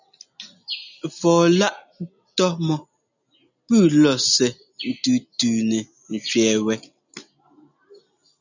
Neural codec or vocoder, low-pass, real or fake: none; 7.2 kHz; real